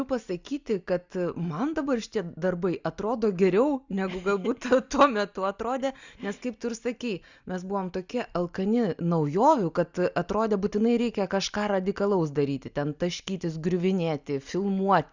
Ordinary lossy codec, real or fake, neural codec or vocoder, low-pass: Opus, 64 kbps; real; none; 7.2 kHz